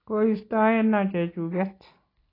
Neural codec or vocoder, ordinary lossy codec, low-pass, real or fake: none; AAC, 24 kbps; 5.4 kHz; real